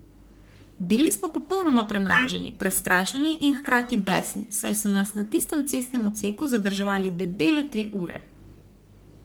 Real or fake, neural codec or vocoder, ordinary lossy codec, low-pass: fake; codec, 44.1 kHz, 1.7 kbps, Pupu-Codec; none; none